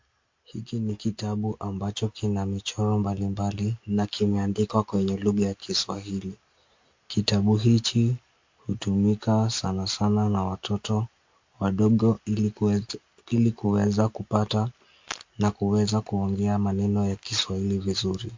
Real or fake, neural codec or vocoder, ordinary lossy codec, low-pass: real; none; MP3, 48 kbps; 7.2 kHz